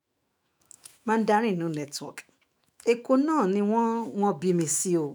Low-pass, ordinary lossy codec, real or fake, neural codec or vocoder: none; none; fake; autoencoder, 48 kHz, 128 numbers a frame, DAC-VAE, trained on Japanese speech